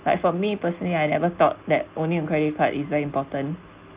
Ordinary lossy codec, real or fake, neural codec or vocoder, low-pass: Opus, 32 kbps; real; none; 3.6 kHz